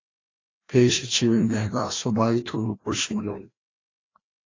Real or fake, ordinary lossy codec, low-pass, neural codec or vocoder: fake; AAC, 32 kbps; 7.2 kHz; codec, 16 kHz, 1 kbps, FreqCodec, larger model